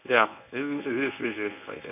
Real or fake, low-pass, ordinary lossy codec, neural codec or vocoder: fake; 3.6 kHz; none; codec, 24 kHz, 0.9 kbps, WavTokenizer, medium speech release version 1